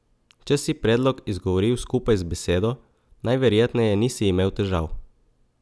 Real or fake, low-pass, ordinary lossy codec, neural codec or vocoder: real; none; none; none